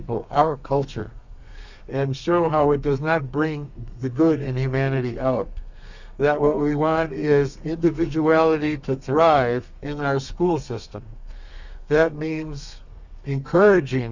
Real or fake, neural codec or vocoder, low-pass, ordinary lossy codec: fake; codec, 32 kHz, 1.9 kbps, SNAC; 7.2 kHz; Opus, 64 kbps